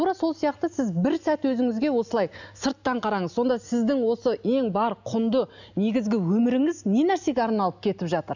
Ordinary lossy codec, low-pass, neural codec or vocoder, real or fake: none; 7.2 kHz; none; real